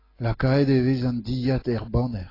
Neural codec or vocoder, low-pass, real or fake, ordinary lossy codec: none; 5.4 kHz; real; AAC, 24 kbps